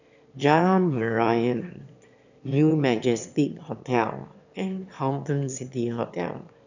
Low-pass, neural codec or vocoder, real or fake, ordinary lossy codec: 7.2 kHz; autoencoder, 22.05 kHz, a latent of 192 numbers a frame, VITS, trained on one speaker; fake; none